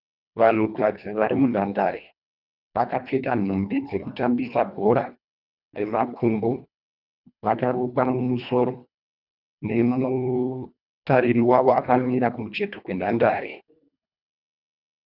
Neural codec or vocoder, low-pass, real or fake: codec, 24 kHz, 1.5 kbps, HILCodec; 5.4 kHz; fake